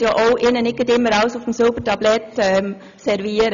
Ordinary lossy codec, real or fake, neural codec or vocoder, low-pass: none; real; none; 7.2 kHz